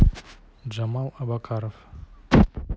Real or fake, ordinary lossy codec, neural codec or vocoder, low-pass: real; none; none; none